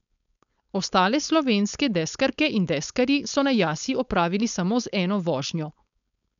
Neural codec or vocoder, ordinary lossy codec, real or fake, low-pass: codec, 16 kHz, 4.8 kbps, FACodec; none; fake; 7.2 kHz